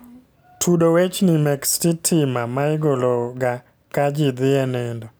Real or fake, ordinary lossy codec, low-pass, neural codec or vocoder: real; none; none; none